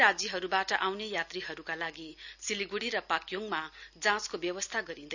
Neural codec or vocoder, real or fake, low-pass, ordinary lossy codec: none; real; 7.2 kHz; none